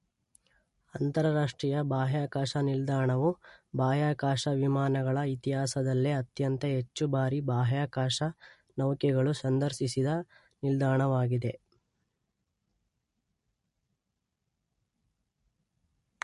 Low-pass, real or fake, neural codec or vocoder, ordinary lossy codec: 14.4 kHz; real; none; MP3, 48 kbps